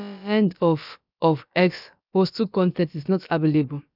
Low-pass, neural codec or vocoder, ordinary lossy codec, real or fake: 5.4 kHz; codec, 16 kHz, about 1 kbps, DyCAST, with the encoder's durations; none; fake